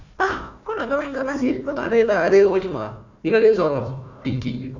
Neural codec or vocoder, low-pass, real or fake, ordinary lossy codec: codec, 16 kHz, 1 kbps, FunCodec, trained on Chinese and English, 50 frames a second; 7.2 kHz; fake; none